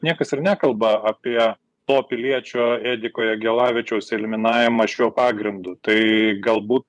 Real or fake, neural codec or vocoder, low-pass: fake; vocoder, 24 kHz, 100 mel bands, Vocos; 10.8 kHz